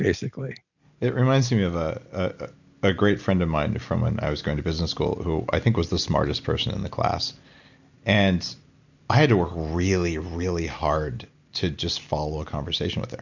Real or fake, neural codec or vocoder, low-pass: real; none; 7.2 kHz